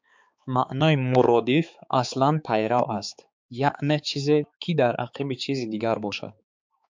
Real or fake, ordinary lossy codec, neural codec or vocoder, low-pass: fake; MP3, 64 kbps; codec, 16 kHz, 4 kbps, X-Codec, HuBERT features, trained on balanced general audio; 7.2 kHz